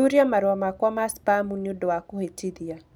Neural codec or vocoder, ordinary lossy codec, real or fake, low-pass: none; none; real; none